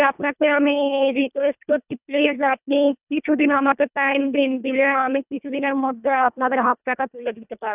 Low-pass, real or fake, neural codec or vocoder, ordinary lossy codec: 3.6 kHz; fake; codec, 24 kHz, 1.5 kbps, HILCodec; none